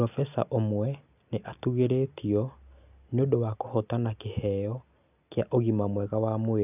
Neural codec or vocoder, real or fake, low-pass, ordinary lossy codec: none; real; 3.6 kHz; none